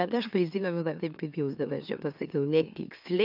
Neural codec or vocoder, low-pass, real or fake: autoencoder, 44.1 kHz, a latent of 192 numbers a frame, MeloTTS; 5.4 kHz; fake